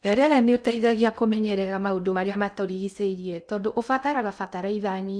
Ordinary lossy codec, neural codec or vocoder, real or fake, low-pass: none; codec, 16 kHz in and 24 kHz out, 0.6 kbps, FocalCodec, streaming, 2048 codes; fake; 9.9 kHz